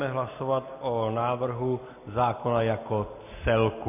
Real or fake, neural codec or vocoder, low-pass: real; none; 3.6 kHz